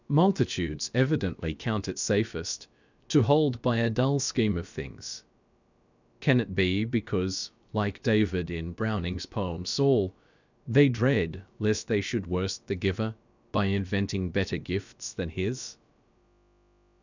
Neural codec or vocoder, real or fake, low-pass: codec, 16 kHz, about 1 kbps, DyCAST, with the encoder's durations; fake; 7.2 kHz